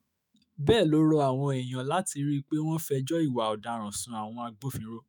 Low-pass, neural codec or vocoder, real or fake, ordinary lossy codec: none; autoencoder, 48 kHz, 128 numbers a frame, DAC-VAE, trained on Japanese speech; fake; none